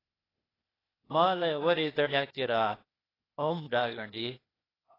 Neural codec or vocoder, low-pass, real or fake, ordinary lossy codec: codec, 16 kHz, 0.8 kbps, ZipCodec; 5.4 kHz; fake; AAC, 24 kbps